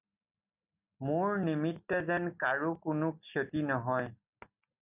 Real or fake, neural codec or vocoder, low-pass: real; none; 3.6 kHz